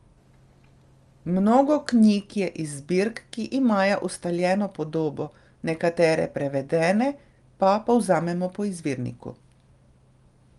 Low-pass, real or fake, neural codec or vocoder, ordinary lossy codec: 10.8 kHz; real; none; Opus, 32 kbps